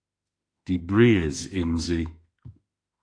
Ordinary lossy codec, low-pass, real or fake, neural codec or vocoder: AAC, 48 kbps; 9.9 kHz; fake; autoencoder, 48 kHz, 32 numbers a frame, DAC-VAE, trained on Japanese speech